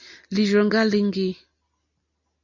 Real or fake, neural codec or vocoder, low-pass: real; none; 7.2 kHz